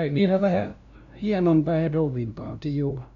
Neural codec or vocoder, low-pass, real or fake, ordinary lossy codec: codec, 16 kHz, 0.5 kbps, FunCodec, trained on LibriTTS, 25 frames a second; 7.2 kHz; fake; none